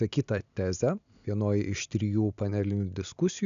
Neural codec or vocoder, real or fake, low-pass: none; real; 7.2 kHz